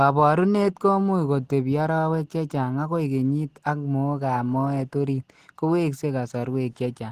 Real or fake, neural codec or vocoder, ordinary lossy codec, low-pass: real; none; Opus, 16 kbps; 14.4 kHz